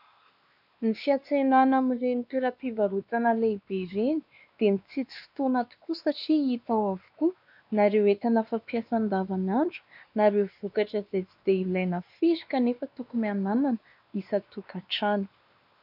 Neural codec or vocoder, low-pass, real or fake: codec, 16 kHz, 2 kbps, X-Codec, WavLM features, trained on Multilingual LibriSpeech; 5.4 kHz; fake